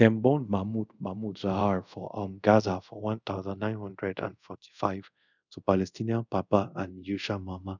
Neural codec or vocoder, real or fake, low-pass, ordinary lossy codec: codec, 24 kHz, 0.5 kbps, DualCodec; fake; 7.2 kHz; none